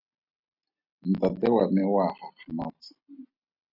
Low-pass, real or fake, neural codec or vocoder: 5.4 kHz; real; none